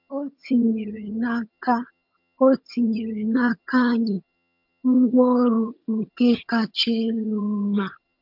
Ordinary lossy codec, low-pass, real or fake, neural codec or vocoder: MP3, 48 kbps; 5.4 kHz; fake; vocoder, 22.05 kHz, 80 mel bands, HiFi-GAN